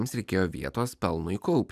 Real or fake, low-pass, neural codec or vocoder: real; 14.4 kHz; none